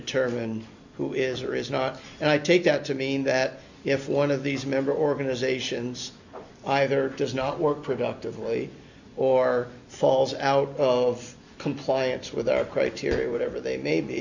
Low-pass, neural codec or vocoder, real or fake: 7.2 kHz; none; real